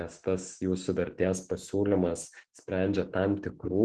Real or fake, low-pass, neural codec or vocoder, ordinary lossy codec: fake; 10.8 kHz; autoencoder, 48 kHz, 128 numbers a frame, DAC-VAE, trained on Japanese speech; Opus, 16 kbps